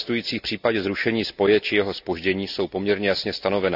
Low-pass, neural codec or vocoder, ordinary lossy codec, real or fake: 5.4 kHz; none; none; real